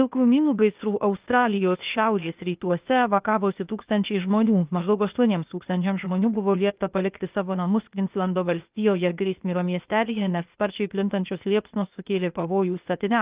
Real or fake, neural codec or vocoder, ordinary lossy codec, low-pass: fake; codec, 16 kHz, 0.8 kbps, ZipCodec; Opus, 24 kbps; 3.6 kHz